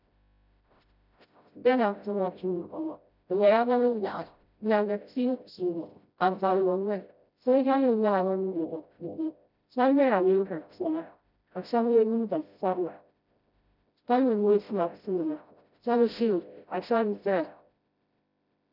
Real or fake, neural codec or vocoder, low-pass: fake; codec, 16 kHz, 0.5 kbps, FreqCodec, smaller model; 5.4 kHz